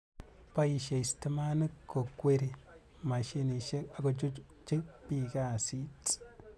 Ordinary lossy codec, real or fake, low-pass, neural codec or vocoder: none; real; none; none